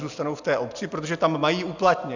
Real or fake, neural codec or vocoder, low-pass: real; none; 7.2 kHz